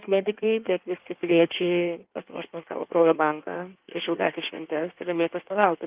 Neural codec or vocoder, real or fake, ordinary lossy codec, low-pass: codec, 16 kHz in and 24 kHz out, 1.1 kbps, FireRedTTS-2 codec; fake; Opus, 32 kbps; 3.6 kHz